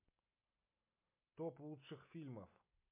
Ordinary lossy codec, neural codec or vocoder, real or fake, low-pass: MP3, 32 kbps; none; real; 3.6 kHz